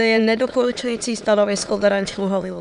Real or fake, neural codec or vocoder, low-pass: fake; autoencoder, 22.05 kHz, a latent of 192 numbers a frame, VITS, trained on many speakers; 9.9 kHz